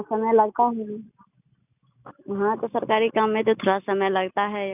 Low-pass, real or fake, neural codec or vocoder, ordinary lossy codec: 3.6 kHz; real; none; none